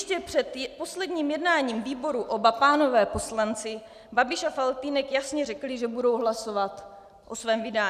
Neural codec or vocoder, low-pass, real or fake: none; 14.4 kHz; real